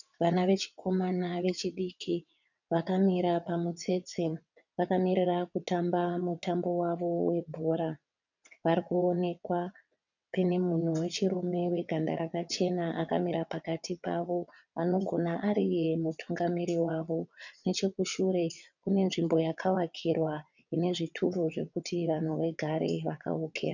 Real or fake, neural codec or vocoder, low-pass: fake; vocoder, 22.05 kHz, 80 mel bands, WaveNeXt; 7.2 kHz